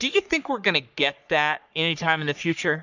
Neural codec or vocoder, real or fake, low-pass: codec, 44.1 kHz, 3.4 kbps, Pupu-Codec; fake; 7.2 kHz